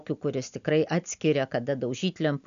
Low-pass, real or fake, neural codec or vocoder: 7.2 kHz; real; none